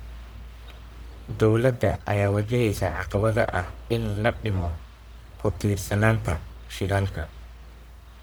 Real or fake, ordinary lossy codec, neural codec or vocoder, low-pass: fake; none; codec, 44.1 kHz, 1.7 kbps, Pupu-Codec; none